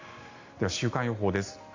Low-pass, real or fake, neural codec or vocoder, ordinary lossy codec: 7.2 kHz; real; none; AAC, 48 kbps